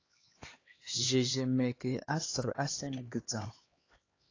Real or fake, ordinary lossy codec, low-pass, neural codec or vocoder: fake; AAC, 32 kbps; 7.2 kHz; codec, 16 kHz, 2 kbps, X-Codec, HuBERT features, trained on LibriSpeech